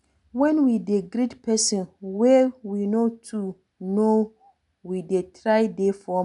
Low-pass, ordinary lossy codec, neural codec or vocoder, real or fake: 10.8 kHz; none; none; real